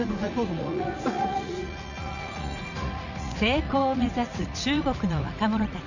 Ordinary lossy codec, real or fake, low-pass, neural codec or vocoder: none; fake; 7.2 kHz; vocoder, 44.1 kHz, 80 mel bands, Vocos